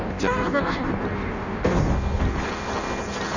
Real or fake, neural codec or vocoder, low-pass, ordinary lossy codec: fake; codec, 16 kHz in and 24 kHz out, 0.6 kbps, FireRedTTS-2 codec; 7.2 kHz; none